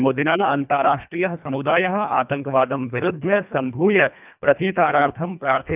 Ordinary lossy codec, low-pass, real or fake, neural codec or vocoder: none; 3.6 kHz; fake; codec, 24 kHz, 1.5 kbps, HILCodec